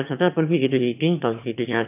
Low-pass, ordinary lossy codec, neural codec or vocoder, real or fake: 3.6 kHz; none; autoencoder, 22.05 kHz, a latent of 192 numbers a frame, VITS, trained on one speaker; fake